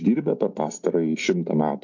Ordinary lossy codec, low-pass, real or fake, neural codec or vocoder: MP3, 48 kbps; 7.2 kHz; real; none